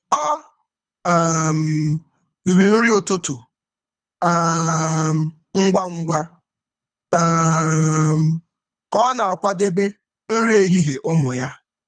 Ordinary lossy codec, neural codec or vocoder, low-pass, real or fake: none; codec, 24 kHz, 3 kbps, HILCodec; 9.9 kHz; fake